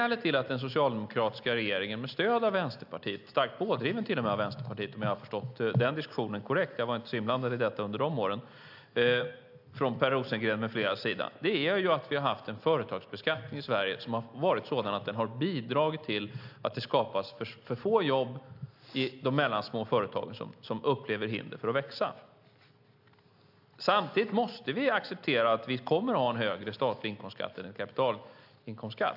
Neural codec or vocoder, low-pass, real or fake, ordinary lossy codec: none; 5.4 kHz; real; AAC, 48 kbps